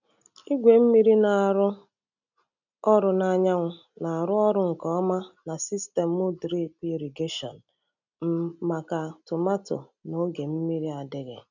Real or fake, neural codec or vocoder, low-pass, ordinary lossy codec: real; none; 7.2 kHz; none